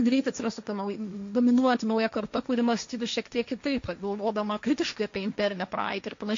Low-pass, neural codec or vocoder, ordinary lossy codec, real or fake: 7.2 kHz; codec, 16 kHz, 1.1 kbps, Voila-Tokenizer; MP3, 48 kbps; fake